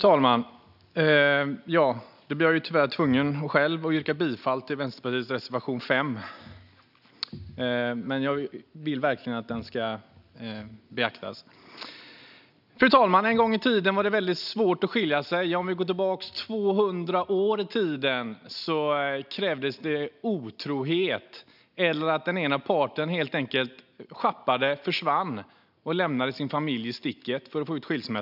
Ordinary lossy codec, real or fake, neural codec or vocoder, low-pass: none; real; none; 5.4 kHz